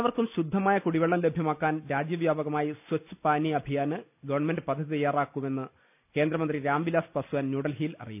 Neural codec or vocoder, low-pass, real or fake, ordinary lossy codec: none; 3.6 kHz; real; none